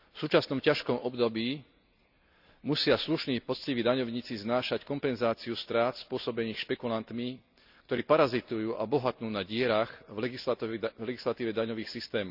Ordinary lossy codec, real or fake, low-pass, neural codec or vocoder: none; real; 5.4 kHz; none